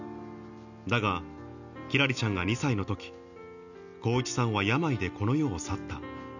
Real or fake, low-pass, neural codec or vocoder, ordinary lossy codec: real; 7.2 kHz; none; none